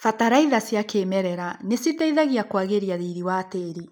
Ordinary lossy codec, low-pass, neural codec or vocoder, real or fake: none; none; none; real